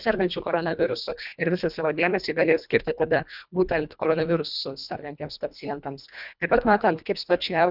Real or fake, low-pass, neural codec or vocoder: fake; 5.4 kHz; codec, 24 kHz, 1.5 kbps, HILCodec